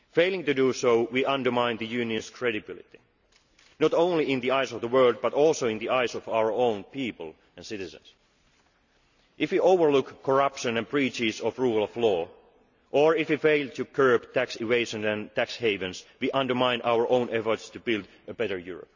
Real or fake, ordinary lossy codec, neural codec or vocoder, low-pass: real; none; none; 7.2 kHz